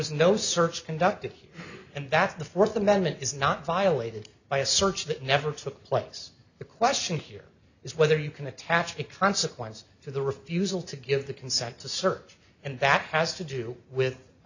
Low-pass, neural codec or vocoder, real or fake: 7.2 kHz; none; real